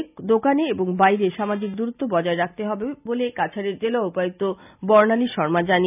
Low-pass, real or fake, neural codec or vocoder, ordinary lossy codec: 3.6 kHz; real; none; none